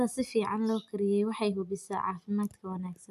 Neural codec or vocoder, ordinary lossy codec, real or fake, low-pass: none; AAC, 96 kbps; real; 14.4 kHz